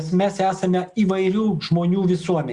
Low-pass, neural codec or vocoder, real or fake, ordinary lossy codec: 10.8 kHz; none; real; Opus, 64 kbps